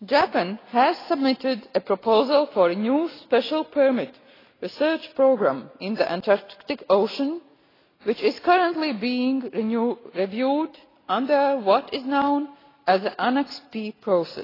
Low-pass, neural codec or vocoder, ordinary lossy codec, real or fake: 5.4 kHz; none; AAC, 24 kbps; real